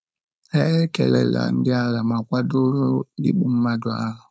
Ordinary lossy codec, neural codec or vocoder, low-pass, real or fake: none; codec, 16 kHz, 4.8 kbps, FACodec; none; fake